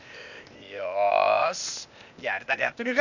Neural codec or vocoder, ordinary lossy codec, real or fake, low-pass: codec, 16 kHz, 0.8 kbps, ZipCodec; none; fake; 7.2 kHz